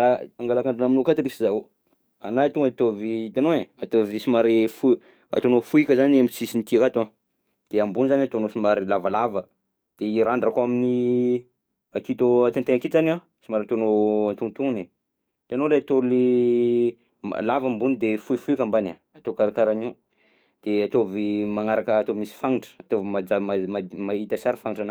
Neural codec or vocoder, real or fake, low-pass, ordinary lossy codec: codec, 44.1 kHz, 7.8 kbps, DAC; fake; none; none